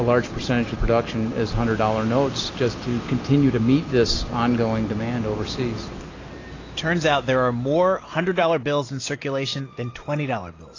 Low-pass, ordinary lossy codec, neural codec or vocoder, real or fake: 7.2 kHz; AAC, 32 kbps; none; real